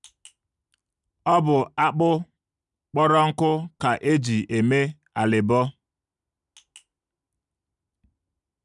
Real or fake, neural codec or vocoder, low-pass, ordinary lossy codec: real; none; 10.8 kHz; none